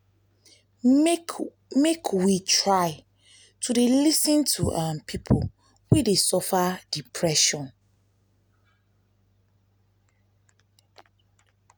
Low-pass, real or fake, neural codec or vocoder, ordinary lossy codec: none; real; none; none